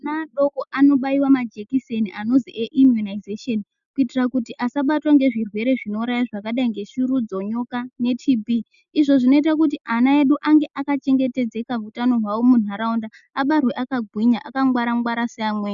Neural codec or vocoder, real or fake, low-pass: none; real; 7.2 kHz